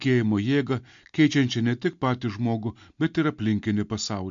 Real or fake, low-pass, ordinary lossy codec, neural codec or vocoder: real; 7.2 kHz; MP3, 64 kbps; none